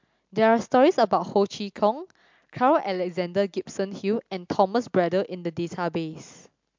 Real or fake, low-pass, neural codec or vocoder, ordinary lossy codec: real; 7.2 kHz; none; MP3, 64 kbps